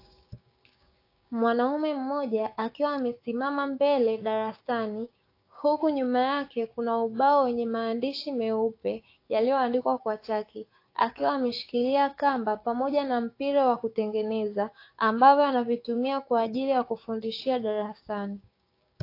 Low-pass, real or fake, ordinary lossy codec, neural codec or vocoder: 5.4 kHz; fake; AAC, 32 kbps; codec, 16 kHz, 6 kbps, DAC